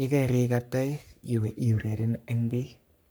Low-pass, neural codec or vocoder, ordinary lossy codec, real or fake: none; codec, 44.1 kHz, 3.4 kbps, Pupu-Codec; none; fake